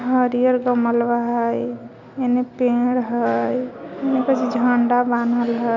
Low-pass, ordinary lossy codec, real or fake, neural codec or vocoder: 7.2 kHz; none; real; none